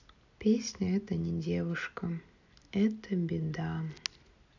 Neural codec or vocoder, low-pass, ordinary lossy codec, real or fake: none; 7.2 kHz; none; real